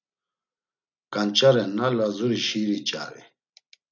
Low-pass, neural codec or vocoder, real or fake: 7.2 kHz; none; real